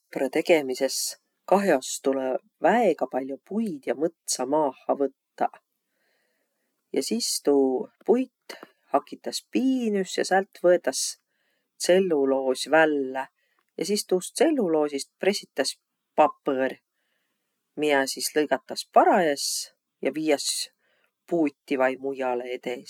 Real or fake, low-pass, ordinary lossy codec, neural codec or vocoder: real; 19.8 kHz; none; none